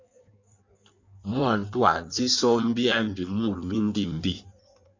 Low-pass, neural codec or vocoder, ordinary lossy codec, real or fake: 7.2 kHz; codec, 16 kHz in and 24 kHz out, 1.1 kbps, FireRedTTS-2 codec; MP3, 64 kbps; fake